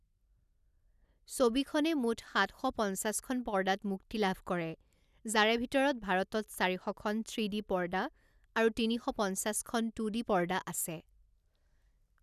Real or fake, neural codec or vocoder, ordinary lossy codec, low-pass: real; none; none; 14.4 kHz